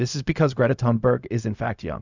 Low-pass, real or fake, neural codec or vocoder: 7.2 kHz; fake; codec, 16 kHz, 0.4 kbps, LongCat-Audio-Codec